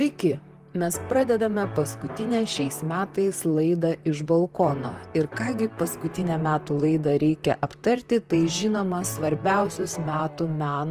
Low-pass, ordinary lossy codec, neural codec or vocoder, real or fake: 14.4 kHz; Opus, 24 kbps; vocoder, 44.1 kHz, 128 mel bands, Pupu-Vocoder; fake